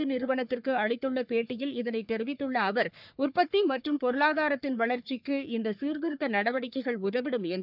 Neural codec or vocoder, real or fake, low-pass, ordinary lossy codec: codec, 44.1 kHz, 3.4 kbps, Pupu-Codec; fake; 5.4 kHz; none